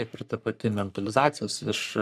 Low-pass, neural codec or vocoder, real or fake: 14.4 kHz; codec, 44.1 kHz, 3.4 kbps, Pupu-Codec; fake